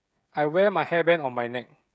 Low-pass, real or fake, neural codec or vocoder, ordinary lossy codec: none; fake; codec, 16 kHz, 16 kbps, FreqCodec, smaller model; none